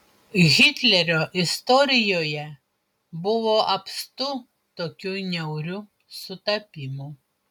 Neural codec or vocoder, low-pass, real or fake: none; 19.8 kHz; real